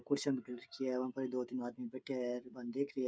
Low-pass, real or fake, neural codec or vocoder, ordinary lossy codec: none; fake; codec, 16 kHz, 16 kbps, FreqCodec, smaller model; none